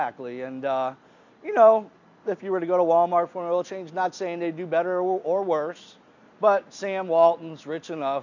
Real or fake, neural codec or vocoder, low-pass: real; none; 7.2 kHz